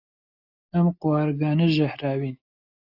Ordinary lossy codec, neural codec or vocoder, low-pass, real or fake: AAC, 48 kbps; none; 5.4 kHz; real